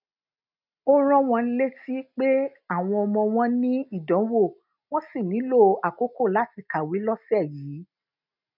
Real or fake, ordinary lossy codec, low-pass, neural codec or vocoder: real; none; 5.4 kHz; none